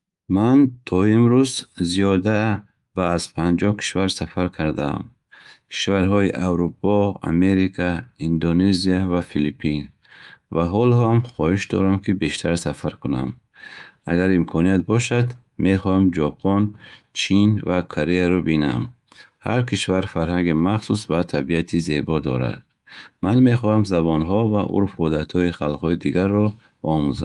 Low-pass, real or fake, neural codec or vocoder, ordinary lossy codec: 10.8 kHz; fake; codec, 24 kHz, 3.1 kbps, DualCodec; Opus, 32 kbps